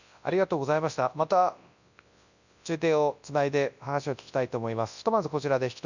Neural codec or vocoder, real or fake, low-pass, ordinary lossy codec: codec, 24 kHz, 0.9 kbps, WavTokenizer, large speech release; fake; 7.2 kHz; none